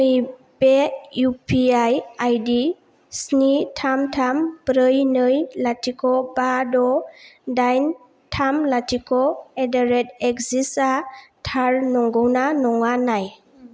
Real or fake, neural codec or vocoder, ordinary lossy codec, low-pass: real; none; none; none